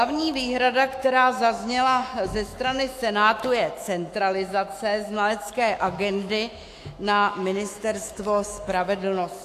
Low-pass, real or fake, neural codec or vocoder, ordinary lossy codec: 14.4 kHz; fake; autoencoder, 48 kHz, 128 numbers a frame, DAC-VAE, trained on Japanese speech; AAC, 64 kbps